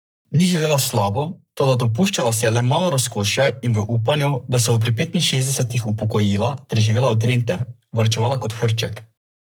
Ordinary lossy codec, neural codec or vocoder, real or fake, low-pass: none; codec, 44.1 kHz, 3.4 kbps, Pupu-Codec; fake; none